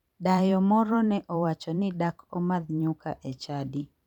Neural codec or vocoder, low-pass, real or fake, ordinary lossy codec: vocoder, 44.1 kHz, 128 mel bands every 512 samples, BigVGAN v2; 19.8 kHz; fake; none